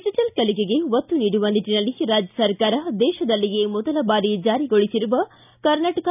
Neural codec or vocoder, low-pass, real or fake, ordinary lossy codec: none; 3.6 kHz; real; none